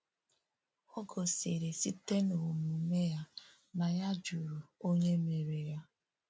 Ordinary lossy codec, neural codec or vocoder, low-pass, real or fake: none; none; none; real